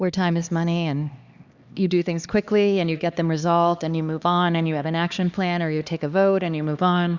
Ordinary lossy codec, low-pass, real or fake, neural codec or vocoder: Opus, 64 kbps; 7.2 kHz; fake; codec, 16 kHz, 2 kbps, X-Codec, HuBERT features, trained on LibriSpeech